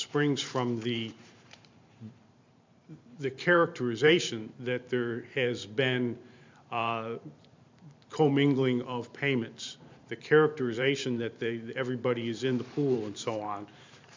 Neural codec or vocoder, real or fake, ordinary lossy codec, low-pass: vocoder, 44.1 kHz, 128 mel bands every 512 samples, BigVGAN v2; fake; MP3, 64 kbps; 7.2 kHz